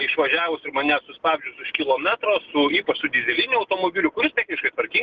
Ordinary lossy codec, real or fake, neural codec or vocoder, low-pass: Opus, 32 kbps; real; none; 7.2 kHz